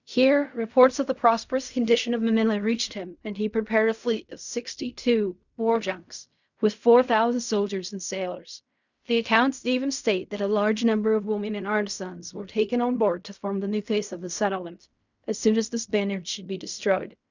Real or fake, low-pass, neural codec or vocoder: fake; 7.2 kHz; codec, 16 kHz in and 24 kHz out, 0.4 kbps, LongCat-Audio-Codec, fine tuned four codebook decoder